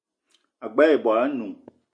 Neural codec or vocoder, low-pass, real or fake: none; 9.9 kHz; real